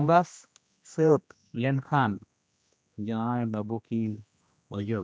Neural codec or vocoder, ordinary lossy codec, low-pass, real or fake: codec, 16 kHz, 1 kbps, X-Codec, HuBERT features, trained on general audio; none; none; fake